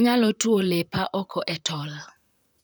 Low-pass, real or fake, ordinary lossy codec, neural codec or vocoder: none; fake; none; vocoder, 44.1 kHz, 128 mel bands, Pupu-Vocoder